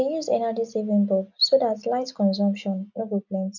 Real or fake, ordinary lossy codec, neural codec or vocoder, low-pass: real; none; none; 7.2 kHz